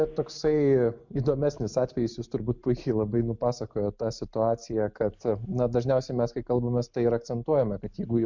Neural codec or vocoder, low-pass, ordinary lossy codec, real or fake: none; 7.2 kHz; MP3, 64 kbps; real